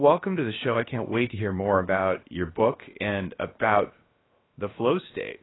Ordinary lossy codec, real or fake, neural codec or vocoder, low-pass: AAC, 16 kbps; fake; codec, 16 kHz, about 1 kbps, DyCAST, with the encoder's durations; 7.2 kHz